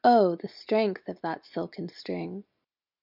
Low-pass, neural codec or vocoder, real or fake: 5.4 kHz; none; real